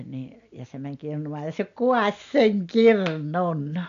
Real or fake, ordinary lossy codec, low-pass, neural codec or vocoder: real; MP3, 64 kbps; 7.2 kHz; none